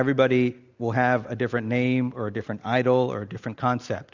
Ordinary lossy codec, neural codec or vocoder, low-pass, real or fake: Opus, 64 kbps; none; 7.2 kHz; real